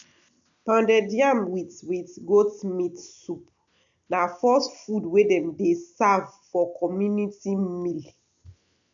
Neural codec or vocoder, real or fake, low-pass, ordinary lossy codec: none; real; 7.2 kHz; none